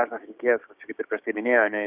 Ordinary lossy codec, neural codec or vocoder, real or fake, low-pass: Opus, 64 kbps; codec, 16 kHz, 6 kbps, DAC; fake; 3.6 kHz